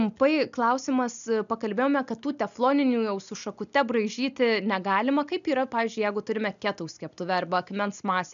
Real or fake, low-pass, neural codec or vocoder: real; 7.2 kHz; none